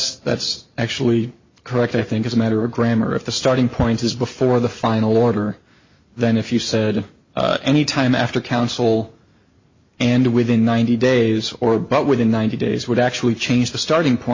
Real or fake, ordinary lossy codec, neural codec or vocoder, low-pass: real; MP3, 32 kbps; none; 7.2 kHz